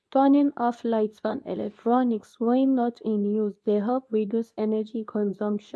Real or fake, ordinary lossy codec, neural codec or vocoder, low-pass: fake; none; codec, 24 kHz, 0.9 kbps, WavTokenizer, medium speech release version 2; none